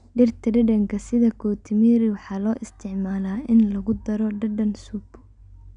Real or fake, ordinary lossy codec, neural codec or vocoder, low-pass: real; none; none; 9.9 kHz